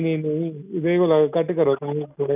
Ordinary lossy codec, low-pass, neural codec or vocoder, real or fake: none; 3.6 kHz; none; real